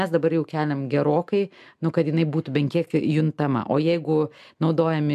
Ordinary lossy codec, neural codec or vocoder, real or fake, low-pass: MP3, 96 kbps; none; real; 14.4 kHz